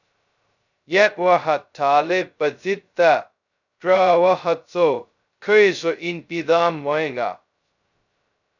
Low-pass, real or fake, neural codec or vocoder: 7.2 kHz; fake; codec, 16 kHz, 0.2 kbps, FocalCodec